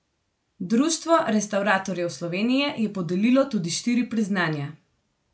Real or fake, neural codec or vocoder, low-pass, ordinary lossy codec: real; none; none; none